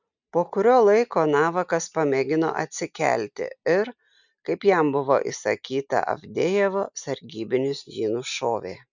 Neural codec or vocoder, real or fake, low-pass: none; real; 7.2 kHz